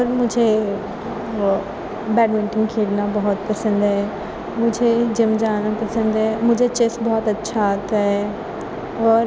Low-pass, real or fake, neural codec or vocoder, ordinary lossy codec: none; real; none; none